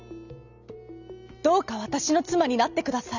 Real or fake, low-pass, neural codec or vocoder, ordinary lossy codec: real; 7.2 kHz; none; none